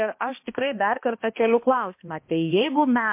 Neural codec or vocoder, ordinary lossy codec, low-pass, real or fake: codec, 16 kHz, 1 kbps, X-Codec, HuBERT features, trained on balanced general audio; MP3, 24 kbps; 3.6 kHz; fake